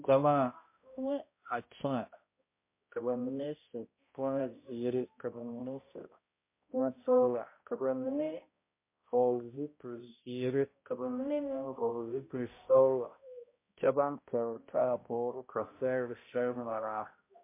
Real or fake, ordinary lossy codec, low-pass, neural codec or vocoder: fake; MP3, 24 kbps; 3.6 kHz; codec, 16 kHz, 0.5 kbps, X-Codec, HuBERT features, trained on balanced general audio